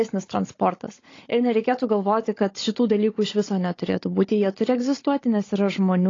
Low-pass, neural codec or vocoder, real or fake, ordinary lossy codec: 7.2 kHz; codec, 16 kHz, 16 kbps, FunCodec, trained on Chinese and English, 50 frames a second; fake; AAC, 32 kbps